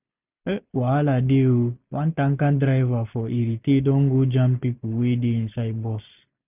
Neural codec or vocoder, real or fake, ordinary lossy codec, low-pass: none; real; none; 3.6 kHz